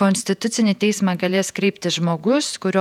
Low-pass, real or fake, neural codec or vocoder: 19.8 kHz; fake; vocoder, 48 kHz, 128 mel bands, Vocos